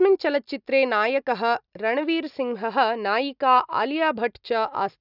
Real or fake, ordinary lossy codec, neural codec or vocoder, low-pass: real; none; none; 5.4 kHz